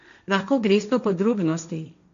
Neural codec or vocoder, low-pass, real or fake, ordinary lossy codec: codec, 16 kHz, 1.1 kbps, Voila-Tokenizer; 7.2 kHz; fake; MP3, 64 kbps